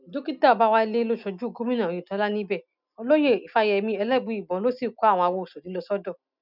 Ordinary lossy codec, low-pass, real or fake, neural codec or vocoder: none; 5.4 kHz; real; none